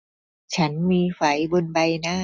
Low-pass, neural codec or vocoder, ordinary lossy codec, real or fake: none; none; none; real